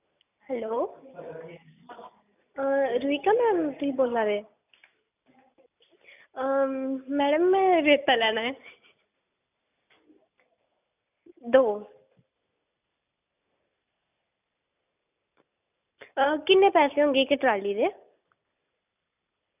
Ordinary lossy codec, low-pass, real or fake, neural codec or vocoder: none; 3.6 kHz; real; none